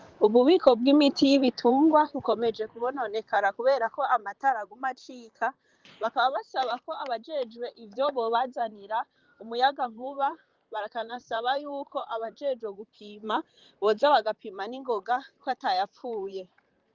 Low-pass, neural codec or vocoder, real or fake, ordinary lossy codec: 7.2 kHz; vocoder, 44.1 kHz, 128 mel bands, Pupu-Vocoder; fake; Opus, 32 kbps